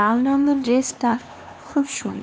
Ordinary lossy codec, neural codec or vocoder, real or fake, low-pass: none; codec, 16 kHz, 2 kbps, X-Codec, HuBERT features, trained on LibriSpeech; fake; none